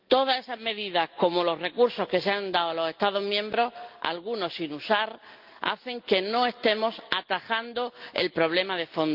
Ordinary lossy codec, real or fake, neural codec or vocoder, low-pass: Opus, 24 kbps; real; none; 5.4 kHz